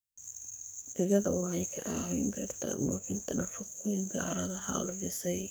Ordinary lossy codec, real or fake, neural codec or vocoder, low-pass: none; fake; codec, 44.1 kHz, 2.6 kbps, SNAC; none